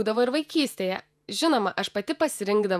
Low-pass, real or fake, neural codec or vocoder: 14.4 kHz; fake; vocoder, 48 kHz, 128 mel bands, Vocos